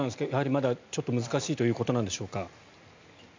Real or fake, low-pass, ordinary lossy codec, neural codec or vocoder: real; 7.2 kHz; MP3, 64 kbps; none